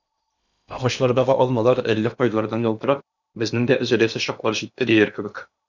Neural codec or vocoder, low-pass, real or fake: codec, 16 kHz in and 24 kHz out, 0.8 kbps, FocalCodec, streaming, 65536 codes; 7.2 kHz; fake